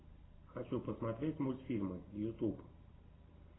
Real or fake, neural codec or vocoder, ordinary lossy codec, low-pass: real; none; AAC, 16 kbps; 7.2 kHz